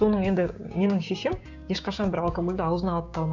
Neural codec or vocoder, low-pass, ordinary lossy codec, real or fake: codec, 16 kHz, 6 kbps, DAC; 7.2 kHz; none; fake